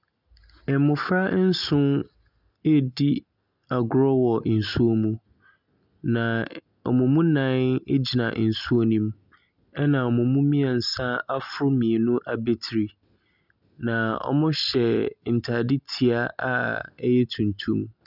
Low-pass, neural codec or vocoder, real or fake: 5.4 kHz; none; real